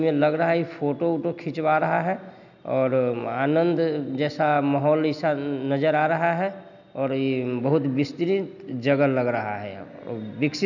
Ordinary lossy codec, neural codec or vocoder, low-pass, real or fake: none; none; 7.2 kHz; real